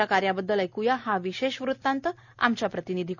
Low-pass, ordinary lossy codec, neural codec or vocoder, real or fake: 7.2 kHz; none; none; real